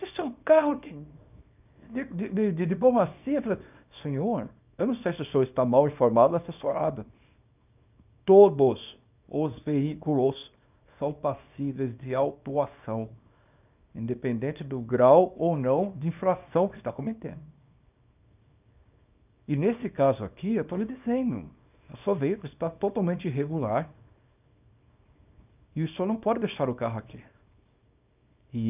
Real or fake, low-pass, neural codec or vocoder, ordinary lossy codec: fake; 3.6 kHz; codec, 24 kHz, 0.9 kbps, WavTokenizer, small release; none